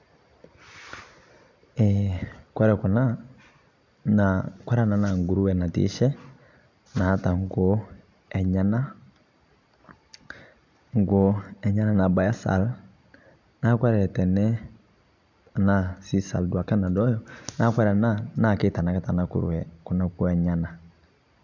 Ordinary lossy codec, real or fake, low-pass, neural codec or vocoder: none; real; 7.2 kHz; none